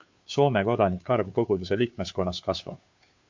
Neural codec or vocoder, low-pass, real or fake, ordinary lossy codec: codec, 16 kHz, 2 kbps, FunCodec, trained on Chinese and English, 25 frames a second; 7.2 kHz; fake; MP3, 48 kbps